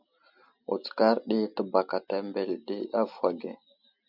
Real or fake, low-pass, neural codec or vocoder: real; 5.4 kHz; none